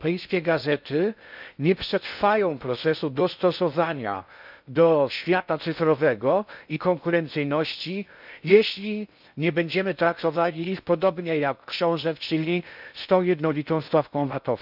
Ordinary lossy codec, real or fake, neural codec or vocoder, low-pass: none; fake; codec, 16 kHz in and 24 kHz out, 0.6 kbps, FocalCodec, streaming, 2048 codes; 5.4 kHz